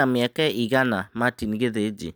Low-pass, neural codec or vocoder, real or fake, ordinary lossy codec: none; none; real; none